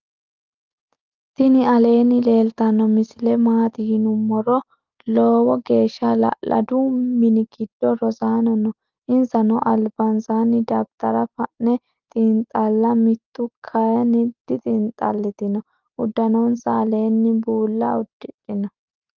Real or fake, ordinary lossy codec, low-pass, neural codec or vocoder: real; Opus, 32 kbps; 7.2 kHz; none